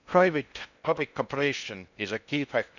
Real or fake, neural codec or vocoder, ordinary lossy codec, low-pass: fake; codec, 16 kHz in and 24 kHz out, 0.6 kbps, FocalCodec, streaming, 2048 codes; none; 7.2 kHz